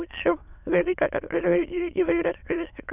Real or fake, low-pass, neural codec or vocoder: fake; 3.6 kHz; autoencoder, 22.05 kHz, a latent of 192 numbers a frame, VITS, trained on many speakers